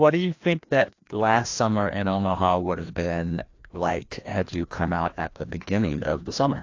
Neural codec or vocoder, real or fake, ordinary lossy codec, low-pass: codec, 16 kHz, 1 kbps, FreqCodec, larger model; fake; AAC, 48 kbps; 7.2 kHz